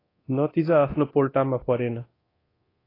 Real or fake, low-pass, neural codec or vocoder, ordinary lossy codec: fake; 5.4 kHz; codec, 24 kHz, 0.9 kbps, DualCodec; AAC, 24 kbps